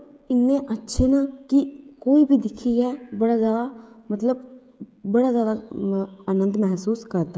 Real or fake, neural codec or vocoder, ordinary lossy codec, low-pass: fake; codec, 16 kHz, 16 kbps, FreqCodec, smaller model; none; none